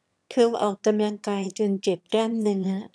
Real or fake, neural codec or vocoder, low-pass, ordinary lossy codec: fake; autoencoder, 22.05 kHz, a latent of 192 numbers a frame, VITS, trained on one speaker; none; none